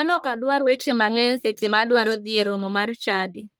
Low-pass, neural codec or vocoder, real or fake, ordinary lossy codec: none; codec, 44.1 kHz, 1.7 kbps, Pupu-Codec; fake; none